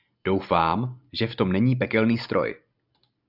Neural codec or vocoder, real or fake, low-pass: none; real; 5.4 kHz